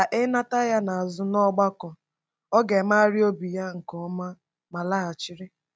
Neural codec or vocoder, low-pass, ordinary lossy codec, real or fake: none; none; none; real